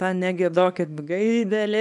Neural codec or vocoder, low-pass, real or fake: codec, 24 kHz, 0.9 kbps, WavTokenizer, small release; 10.8 kHz; fake